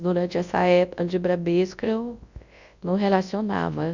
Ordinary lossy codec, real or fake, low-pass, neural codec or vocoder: Opus, 64 kbps; fake; 7.2 kHz; codec, 24 kHz, 0.9 kbps, WavTokenizer, large speech release